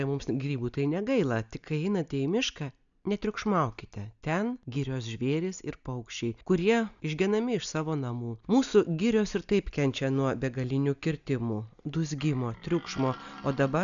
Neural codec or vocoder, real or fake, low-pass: none; real; 7.2 kHz